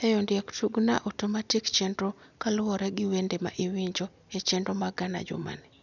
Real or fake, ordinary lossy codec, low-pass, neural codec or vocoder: real; none; 7.2 kHz; none